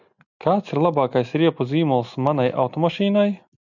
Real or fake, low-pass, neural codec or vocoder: real; 7.2 kHz; none